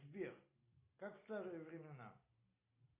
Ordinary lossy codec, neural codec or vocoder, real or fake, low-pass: AAC, 32 kbps; none; real; 3.6 kHz